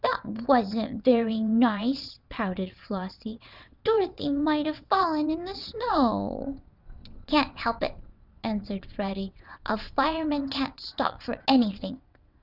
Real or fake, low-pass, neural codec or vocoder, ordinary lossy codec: fake; 5.4 kHz; vocoder, 22.05 kHz, 80 mel bands, WaveNeXt; Opus, 64 kbps